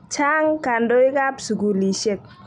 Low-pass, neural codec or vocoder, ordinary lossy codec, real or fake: 10.8 kHz; none; none; real